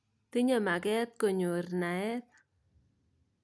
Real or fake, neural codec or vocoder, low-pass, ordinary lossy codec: real; none; none; none